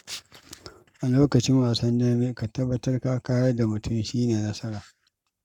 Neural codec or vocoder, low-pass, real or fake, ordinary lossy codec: codec, 44.1 kHz, 7.8 kbps, Pupu-Codec; 19.8 kHz; fake; none